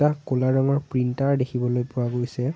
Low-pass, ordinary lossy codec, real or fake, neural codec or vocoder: none; none; real; none